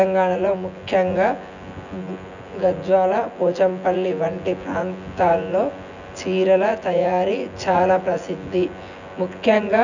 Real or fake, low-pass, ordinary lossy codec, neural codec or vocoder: fake; 7.2 kHz; none; vocoder, 24 kHz, 100 mel bands, Vocos